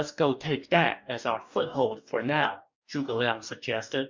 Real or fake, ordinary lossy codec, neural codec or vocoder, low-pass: fake; MP3, 64 kbps; codec, 44.1 kHz, 2.6 kbps, DAC; 7.2 kHz